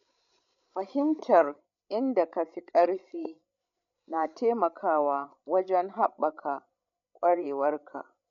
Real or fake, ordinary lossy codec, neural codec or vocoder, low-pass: fake; MP3, 96 kbps; codec, 16 kHz, 16 kbps, FreqCodec, larger model; 7.2 kHz